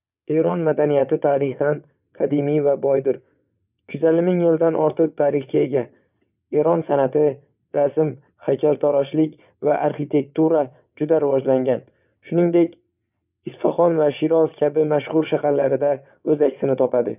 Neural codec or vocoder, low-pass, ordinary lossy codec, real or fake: vocoder, 22.05 kHz, 80 mel bands, WaveNeXt; 3.6 kHz; none; fake